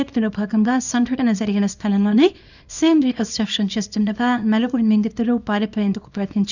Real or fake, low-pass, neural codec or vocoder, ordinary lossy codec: fake; 7.2 kHz; codec, 24 kHz, 0.9 kbps, WavTokenizer, small release; none